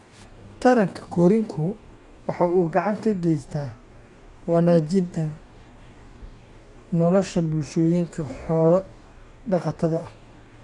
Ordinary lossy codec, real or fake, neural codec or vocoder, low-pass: none; fake; codec, 44.1 kHz, 2.6 kbps, DAC; 10.8 kHz